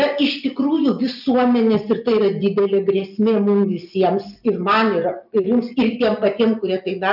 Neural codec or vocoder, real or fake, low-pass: none; real; 5.4 kHz